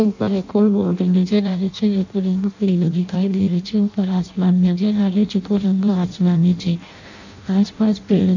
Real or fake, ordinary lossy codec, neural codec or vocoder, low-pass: fake; none; codec, 16 kHz in and 24 kHz out, 0.6 kbps, FireRedTTS-2 codec; 7.2 kHz